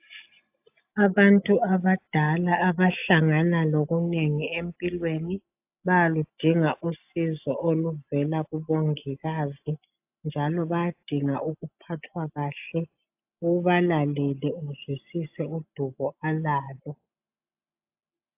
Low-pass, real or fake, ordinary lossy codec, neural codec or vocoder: 3.6 kHz; real; AAC, 32 kbps; none